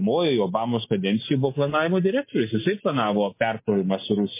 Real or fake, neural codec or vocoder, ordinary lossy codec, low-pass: real; none; MP3, 24 kbps; 3.6 kHz